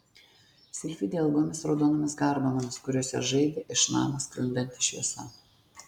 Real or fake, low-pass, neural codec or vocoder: real; 19.8 kHz; none